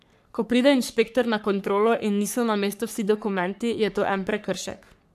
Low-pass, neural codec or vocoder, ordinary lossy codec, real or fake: 14.4 kHz; codec, 44.1 kHz, 3.4 kbps, Pupu-Codec; none; fake